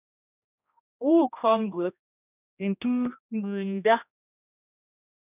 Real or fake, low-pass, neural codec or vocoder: fake; 3.6 kHz; codec, 16 kHz, 1 kbps, X-Codec, HuBERT features, trained on general audio